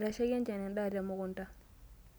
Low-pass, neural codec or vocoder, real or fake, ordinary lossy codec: none; none; real; none